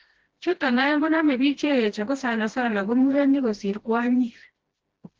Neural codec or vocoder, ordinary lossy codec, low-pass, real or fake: codec, 16 kHz, 1 kbps, FreqCodec, smaller model; Opus, 16 kbps; 7.2 kHz; fake